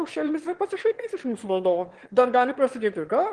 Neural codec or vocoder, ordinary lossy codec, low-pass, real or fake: autoencoder, 22.05 kHz, a latent of 192 numbers a frame, VITS, trained on one speaker; Opus, 16 kbps; 9.9 kHz; fake